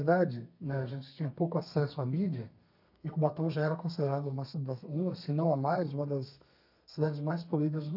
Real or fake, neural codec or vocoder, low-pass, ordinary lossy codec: fake; codec, 32 kHz, 1.9 kbps, SNAC; 5.4 kHz; none